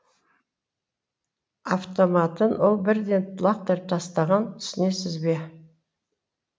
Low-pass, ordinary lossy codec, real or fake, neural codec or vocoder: none; none; real; none